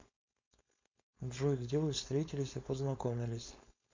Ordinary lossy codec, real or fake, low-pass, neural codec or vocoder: MP3, 64 kbps; fake; 7.2 kHz; codec, 16 kHz, 4.8 kbps, FACodec